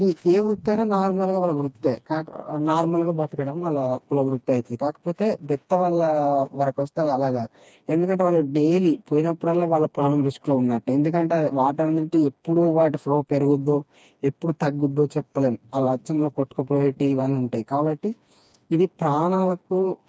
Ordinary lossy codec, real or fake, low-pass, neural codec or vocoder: none; fake; none; codec, 16 kHz, 2 kbps, FreqCodec, smaller model